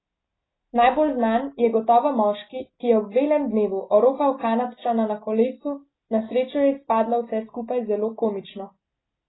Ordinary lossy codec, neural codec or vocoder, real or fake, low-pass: AAC, 16 kbps; none; real; 7.2 kHz